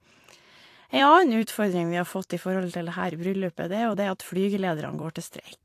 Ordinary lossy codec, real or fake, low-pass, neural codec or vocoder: AAC, 64 kbps; fake; 14.4 kHz; vocoder, 44.1 kHz, 128 mel bands every 256 samples, BigVGAN v2